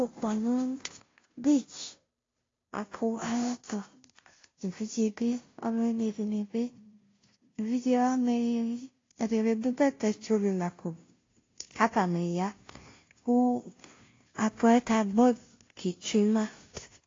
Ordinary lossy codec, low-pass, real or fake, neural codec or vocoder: AAC, 32 kbps; 7.2 kHz; fake; codec, 16 kHz, 0.5 kbps, FunCodec, trained on Chinese and English, 25 frames a second